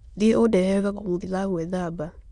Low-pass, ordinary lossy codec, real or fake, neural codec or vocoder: 9.9 kHz; Opus, 64 kbps; fake; autoencoder, 22.05 kHz, a latent of 192 numbers a frame, VITS, trained on many speakers